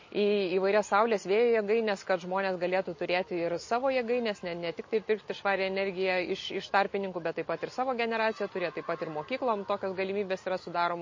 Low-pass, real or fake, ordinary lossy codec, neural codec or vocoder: 7.2 kHz; real; MP3, 32 kbps; none